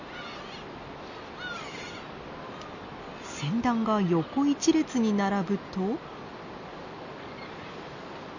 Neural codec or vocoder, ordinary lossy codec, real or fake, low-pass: none; none; real; 7.2 kHz